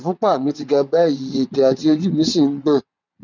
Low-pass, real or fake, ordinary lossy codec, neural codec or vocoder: 7.2 kHz; fake; none; vocoder, 22.05 kHz, 80 mel bands, WaveNeXt